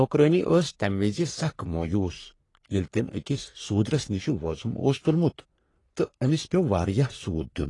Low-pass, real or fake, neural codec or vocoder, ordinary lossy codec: 10.8 kHz; fake; codec, 44.1 kHz, 3.4 kbps, Pupu-Codec; AAC, 32 kbps